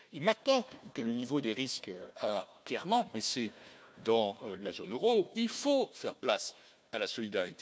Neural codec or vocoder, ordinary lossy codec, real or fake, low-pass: codec, 16 kHz, 1 kbps, FunCodec, trained on Chinese and English, 50 frames a second; none; fake; none